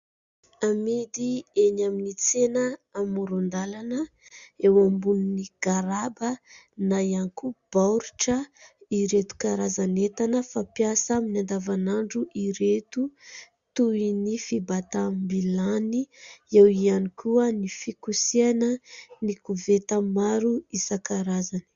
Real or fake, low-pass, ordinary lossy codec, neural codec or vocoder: real; 7.2 kHz; Opus, 64 kbps; none